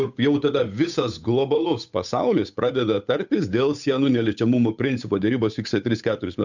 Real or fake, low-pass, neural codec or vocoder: fake; 7.2 kHz; codec, 16 kHz, 8 kbps, FunCodec, trained on Chinese and English, 25 frames a second